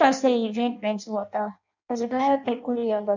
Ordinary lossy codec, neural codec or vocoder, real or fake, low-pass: none; codec, 16 kHz in and 24 kHz out, 0.6 kbps, FireRedTTS-2 codec; fake; 7.2 kHz